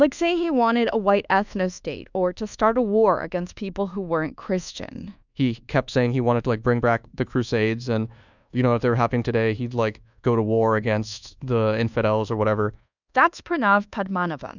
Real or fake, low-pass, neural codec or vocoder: fake; 7.2 kHz; codec, 24 kHz, 1.2 kbps, DualCodec